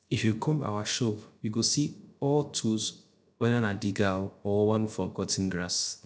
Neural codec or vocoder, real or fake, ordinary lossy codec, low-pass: codec, 16 kHz, 0.3 kbps, FocalCodec; fake; none; none